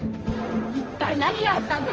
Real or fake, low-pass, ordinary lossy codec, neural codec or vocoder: fake; 7.2 kHz; Opus, 24 kbps; codec, 16 kHz, 1.1 kbps, Voila-Tokenizer